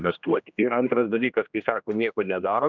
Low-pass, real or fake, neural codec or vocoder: 7.2 kHz; fake; codec, 16 kHz, 1 kbps, X-Codec, HuBERT features, trained on general audio